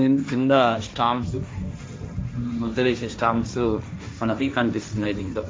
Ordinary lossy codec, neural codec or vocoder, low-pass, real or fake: none; codec, 16 kHz, 1.1 kbps, Voila-Tokenizer; none; fake